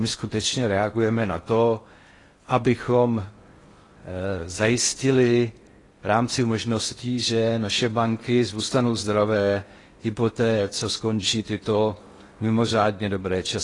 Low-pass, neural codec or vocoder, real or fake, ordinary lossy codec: 10.8 kHz; codec, 16 kHz in and 24 kHz out, 0.6 kbps, FocalCodec, streaming, 4096 codes; fake; AAC, 32 kbps